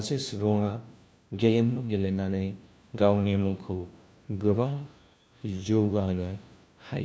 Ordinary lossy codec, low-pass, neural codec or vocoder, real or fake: none; none; codec, 16 kHz, 1 kbps, FunCodec, trained on LibriTTS, 50 frames a second; fake